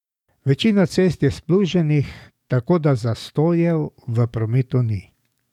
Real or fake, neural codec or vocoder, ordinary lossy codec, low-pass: fake; codec, 44.1 kHz, 7.8 kbps, DAC; none; 19.8 kHz